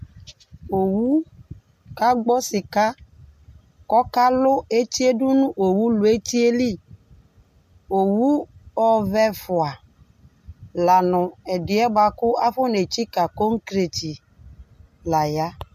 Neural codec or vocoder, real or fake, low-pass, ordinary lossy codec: none; real; 14.4 kHz; MP3, 64 kbps